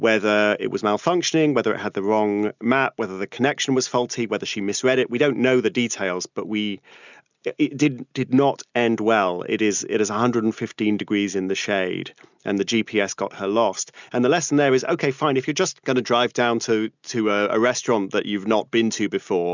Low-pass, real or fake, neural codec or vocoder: 7.2 kHz; real; none